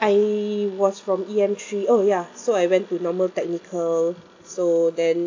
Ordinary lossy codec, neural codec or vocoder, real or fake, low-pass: AAC, 48 kbps; none; real; 7.2 kHz